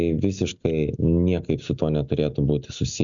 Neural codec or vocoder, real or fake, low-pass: none; real; 7.2 kHz